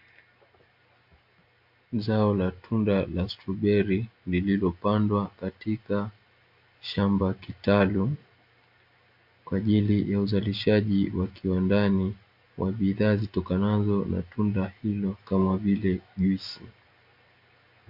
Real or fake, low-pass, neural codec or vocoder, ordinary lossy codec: real; 5.4 kHz; none; MP3, 48 kbps